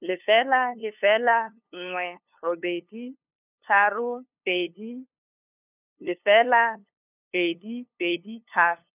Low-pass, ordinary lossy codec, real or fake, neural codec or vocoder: 3.6 kHz; none; fake; codec, 16 kHz, 2 kbps, FunCodec, trained on LibriTTS, 25 frames a second